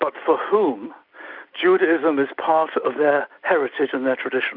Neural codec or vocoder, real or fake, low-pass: none; real; 5.4 kHz